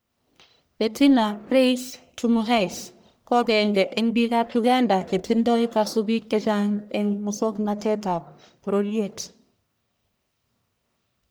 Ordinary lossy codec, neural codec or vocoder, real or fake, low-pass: none; codec, 44.1 kHz, 1.7 kbps, Pupu-Codec; fake; none